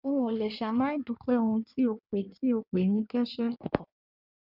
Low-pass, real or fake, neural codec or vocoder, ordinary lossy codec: 5.4 kHz; fake; codec, 16 kHz in and 24 kHz out, 1.1 kbps, FireRedTTS-2 codec; none